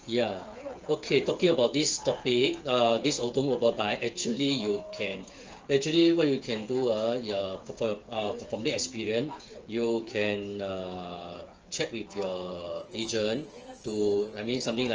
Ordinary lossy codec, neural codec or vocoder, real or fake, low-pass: Opus, 24 kbps; codec, 16 kHz, 8 kbps, FreqCodec, smaller model; fake; 7.2 kHz